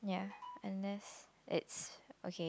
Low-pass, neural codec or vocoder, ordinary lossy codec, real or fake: none; none; none; real